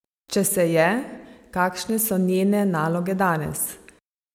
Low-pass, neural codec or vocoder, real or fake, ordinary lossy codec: 19.8 kHz; none; real; MP3, 96 kbps